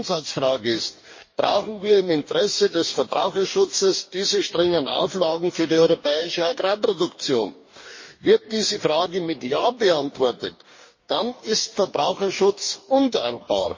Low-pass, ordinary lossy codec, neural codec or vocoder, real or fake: 7.2 kHz; MP3, 32 kbps; codec, 44.1 kHz, 2.6 kbps, DAC; fake